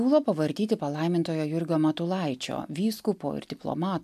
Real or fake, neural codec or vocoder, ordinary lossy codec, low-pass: real; none; AAC, 96 kbps; 14.4 kHz